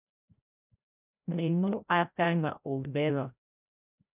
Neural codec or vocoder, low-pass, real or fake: codec, 16 kHz, 0.5 kbps, FreqCodec, larger model; 3.6 kHz; fake